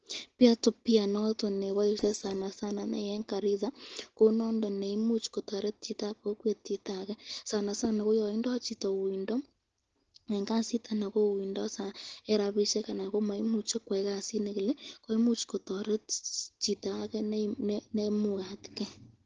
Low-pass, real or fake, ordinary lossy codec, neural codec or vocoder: 7.2 kHz; real; Opus, 16 kbps; none